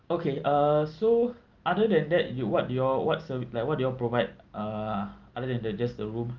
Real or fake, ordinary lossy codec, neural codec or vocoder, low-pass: real; Opus, 24 kbps; none; 7.2 kHz